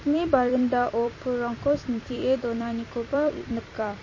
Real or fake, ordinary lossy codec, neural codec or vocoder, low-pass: fake; MP3, 32 kbps; vocoder, 44.1 kHz, 128 mel bands every 256 samples, BigVGAN v2; 7.2 kHz